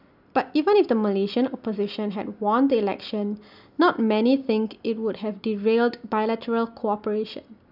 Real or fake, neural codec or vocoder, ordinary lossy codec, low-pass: real; none; none; 5.4 kHz